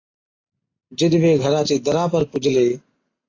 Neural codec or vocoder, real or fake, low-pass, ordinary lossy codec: none; real; 7.2 kHz; AAC, 32 kbps